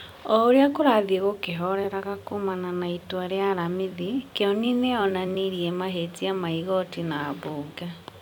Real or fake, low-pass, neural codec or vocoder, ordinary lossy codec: fake; 19.8 kHz; vocoder, 44.1 kHz, 128 mel bands every 256 samples, BigVGAN v2; none